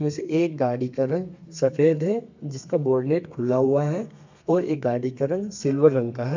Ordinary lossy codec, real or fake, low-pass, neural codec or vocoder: MP3, 64 kbps; fake; 7.2 kHz; codec, 32 kHz, 1.9 kbps, SNAC